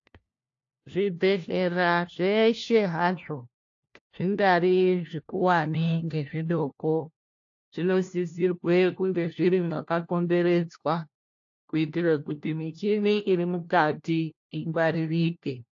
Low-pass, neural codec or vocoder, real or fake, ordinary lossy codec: 7.2 kHz; codec, 16 kHz, 1 kbps, FunCodec, trained on LibriTTS, 50 frames a second; fake; AAC, 48 kbps